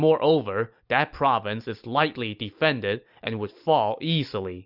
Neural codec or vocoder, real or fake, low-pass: none; real; 5.4 kHz